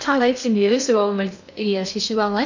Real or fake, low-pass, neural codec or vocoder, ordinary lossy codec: fake; 7.2 kHz; codec, 16 kHz in and 24 kHz out, 0.6 kbps, FocalCodec, streaming, 2048 codes; none